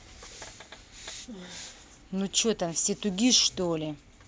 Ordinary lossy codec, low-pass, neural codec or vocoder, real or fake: none; none; none; real